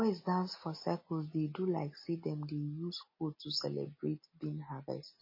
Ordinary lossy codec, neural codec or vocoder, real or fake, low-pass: MP3, 24 kbps; none; real; 5.4 kHz